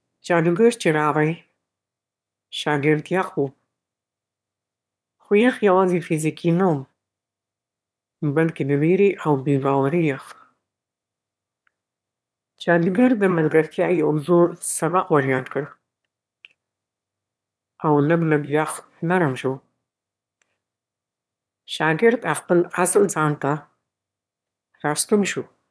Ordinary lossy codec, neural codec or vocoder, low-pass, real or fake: none; autoencoder, 22.05 kHz, a latent of 192 numbers a frame, VITS, trained on one speaker; none; fake